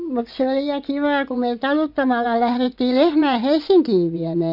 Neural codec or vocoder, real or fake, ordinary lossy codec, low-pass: vocoder, 24 kHz, 100 mel bands, Vocos; fake; none; 5.4 kHz